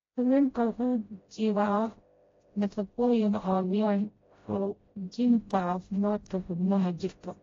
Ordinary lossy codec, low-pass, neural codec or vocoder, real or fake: AAC, 32 kbps; 7.2 kHz; codec, 16 kHz, 0.5 kbps, FreqCodec, smaller model; fake